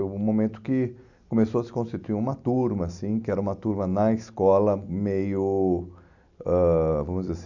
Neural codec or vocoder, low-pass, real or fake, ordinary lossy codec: none; 7.2 kHz; real; none